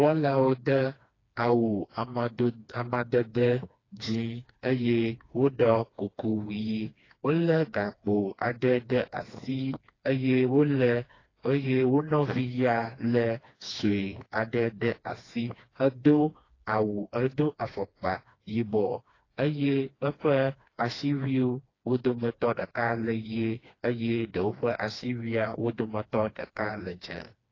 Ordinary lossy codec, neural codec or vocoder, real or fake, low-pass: AAC, 32 kbps; codec, 16 kHz, 2 kbps, FreqCodec, smaller model; fake; 7.2 kHz